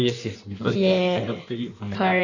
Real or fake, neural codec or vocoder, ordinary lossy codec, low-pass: fake; codec, 16 kHz in and 24 kHz out, 1.1 kbps, FireRedTTS-2 codec; none; 7.2 kHz